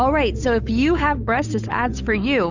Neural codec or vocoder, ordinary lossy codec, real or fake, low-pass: codec, 16 kHz, 8 kbps, FunCodec, trained on Chinese and English, 25 frames a second; Opus, 64 kbps; fake; 7.2 kHz